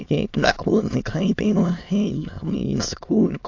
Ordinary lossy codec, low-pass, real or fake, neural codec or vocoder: AAC, 48 kbps; 7.2 kHz; fake; autoencoder, 22.05 kHz, a latent of 192 numbers a frame, VITS, trained on many speakers